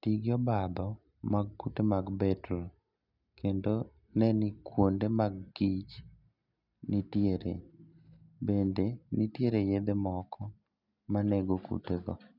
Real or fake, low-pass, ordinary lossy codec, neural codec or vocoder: real; 5.4 kHz; none; none